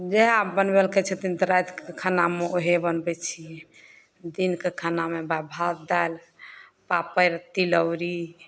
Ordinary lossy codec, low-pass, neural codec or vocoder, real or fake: none; none; none; real